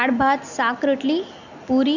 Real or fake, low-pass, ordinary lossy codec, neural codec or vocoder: real; 7.2 kHz; none; none